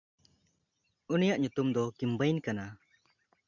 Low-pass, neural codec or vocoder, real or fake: 7.2 kHz; none; real